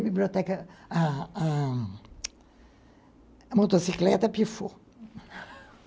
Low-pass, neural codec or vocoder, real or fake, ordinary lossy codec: none; none; real; none